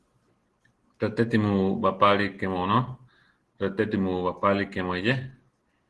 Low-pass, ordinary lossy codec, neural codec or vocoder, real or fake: 10.8 kHz; Opus, 16 kbps; none; real